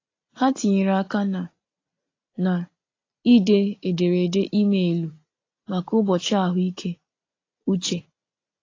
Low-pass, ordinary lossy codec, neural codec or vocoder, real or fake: 7.2 kHz; AAC, 32 kbps; none; real